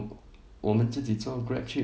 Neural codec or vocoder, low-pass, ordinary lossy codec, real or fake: none; none; none; real